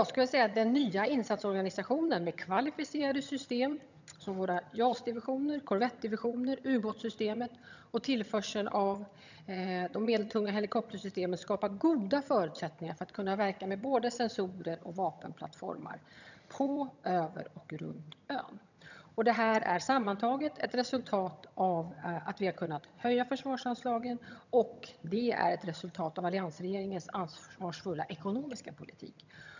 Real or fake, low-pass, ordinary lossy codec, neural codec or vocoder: fake; 7.2 kHz; none; vocoder, 22.05 kHz, 80 mel bands, HiFi-GAN